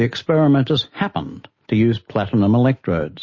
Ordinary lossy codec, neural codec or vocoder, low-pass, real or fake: MP3, 32 kbps; none; 7.2 kHz; real